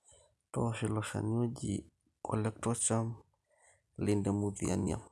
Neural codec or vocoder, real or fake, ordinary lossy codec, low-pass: none; real; none; none